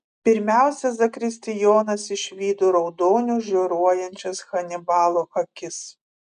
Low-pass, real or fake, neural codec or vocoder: 9.9 kHz; real; none